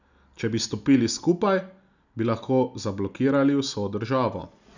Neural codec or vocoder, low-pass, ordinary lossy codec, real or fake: none; 7.2 kHz; none; real